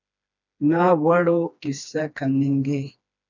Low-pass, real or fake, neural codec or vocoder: 7.2 kHz; fake; codec, 16 kHz, 2 kbps, FreqCodec, smaller model